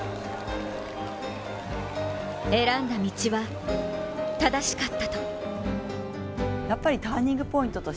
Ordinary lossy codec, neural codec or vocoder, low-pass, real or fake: none; none; none; real